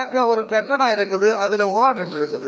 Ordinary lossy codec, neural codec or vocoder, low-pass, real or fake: none; codec, 16 kHz, 1 kbps, FreqCodec, larger model; none; fake